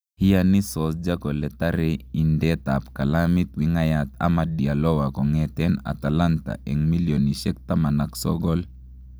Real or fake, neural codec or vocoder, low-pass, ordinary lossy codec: real; none; none; none